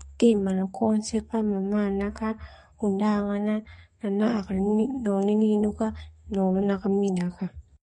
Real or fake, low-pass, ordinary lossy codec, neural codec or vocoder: fake; 14.4 kHz; MP3, 48 kbps; codec, 32 kHz, 1.9 kbps, SNAC